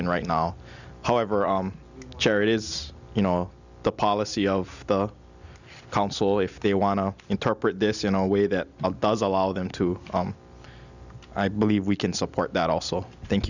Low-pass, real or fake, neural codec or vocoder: 7.2 kHz; real; none